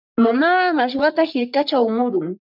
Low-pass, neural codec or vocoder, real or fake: 5.4 kHz; codec, 44.1 kHz, 3.4 kbps, Pupu-Codec; fake